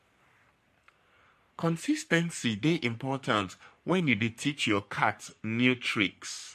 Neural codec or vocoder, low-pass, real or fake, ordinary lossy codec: codec, 44.1 kHz, 3.4 kbps, Pupu-Codec; 14.4 kHz; fake; MP3, 64 kbps